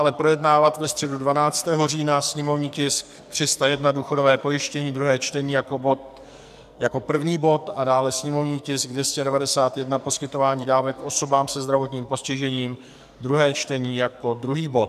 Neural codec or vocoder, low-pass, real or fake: codec, 44.1 kHz, 2.6 kbps, SNAC; 14.4 kHz; fake